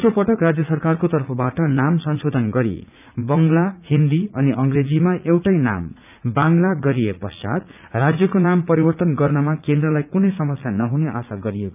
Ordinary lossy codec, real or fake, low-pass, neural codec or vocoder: none; fake; 3.6 kHz; vocoder, 44.1 kHz, 80 mel bands, Vocos